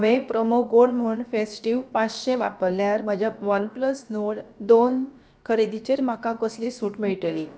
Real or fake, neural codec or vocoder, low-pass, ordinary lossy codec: fake; codec, 16 kHz, about 1 kbps, DyCAST, with the encoder's durations; none; none